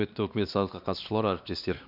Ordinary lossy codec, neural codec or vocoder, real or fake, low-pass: none; codec, 16 kHz, 2 kbps, X-Codec, WavLM features, trained on Multilingual LibriSpeech; fake; 5.4 kHz